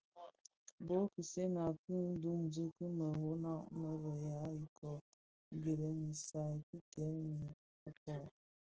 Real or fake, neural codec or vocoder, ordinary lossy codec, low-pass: real; none; Opus, 16 kbps; 7.2 kHz